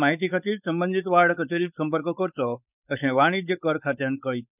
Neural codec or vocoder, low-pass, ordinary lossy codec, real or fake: codec, 16 kHz, 4.8 kbps, FACodec; 3.6 kHz; none; fake